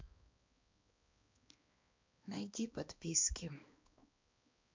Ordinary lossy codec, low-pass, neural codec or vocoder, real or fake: MP3, 64 kbps; 7.2 kHz; codec, 16 kHz, 2 kbps, X-Codec, HuBERT features, trained on balanced general audio; fake